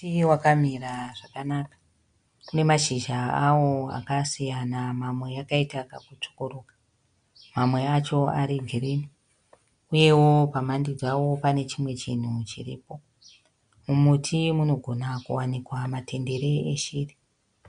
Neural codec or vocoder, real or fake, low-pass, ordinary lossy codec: none; real; 9.9 kHz; MP3, 64 kbps